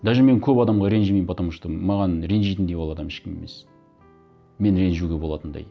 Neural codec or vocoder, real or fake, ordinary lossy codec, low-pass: none; real; none; none